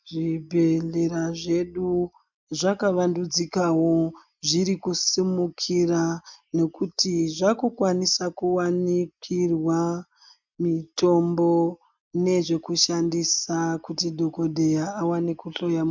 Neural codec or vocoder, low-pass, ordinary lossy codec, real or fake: none; 7.2 kHz; MP3, 64 kbps; real